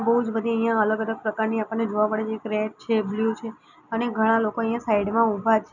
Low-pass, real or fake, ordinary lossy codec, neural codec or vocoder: 7.2 kHz; real; none; none